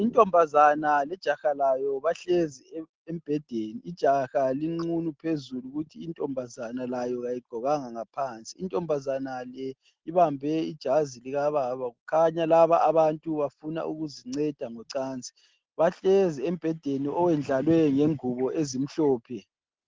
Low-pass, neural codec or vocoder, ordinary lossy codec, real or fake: 7.2 kHz; none; Opus, 16 kbps; real